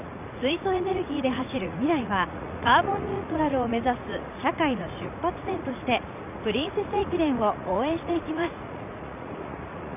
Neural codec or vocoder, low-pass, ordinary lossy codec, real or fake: vocoder, 44.1 kHz, 80 mel bands, Vocos; 3.6 kHz; none; fake